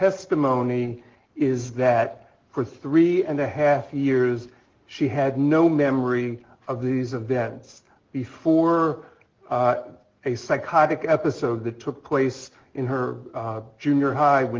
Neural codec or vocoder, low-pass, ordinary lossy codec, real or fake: none; 7.2 kHz; Opus, 16 kbps; real